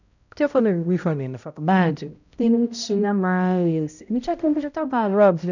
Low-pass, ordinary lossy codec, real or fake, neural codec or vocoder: 7.2 kHz; none; fake; codec, 16 kHz, 0.5 kbps, X-Codec, HuBERT features, trained on balanced general audio